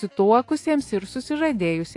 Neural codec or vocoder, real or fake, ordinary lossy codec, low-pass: none; real; AAC, 48 kbps; 10.8 kHz